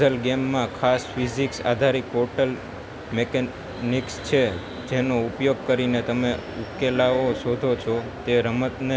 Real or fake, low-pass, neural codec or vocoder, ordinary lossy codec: real; none; none; none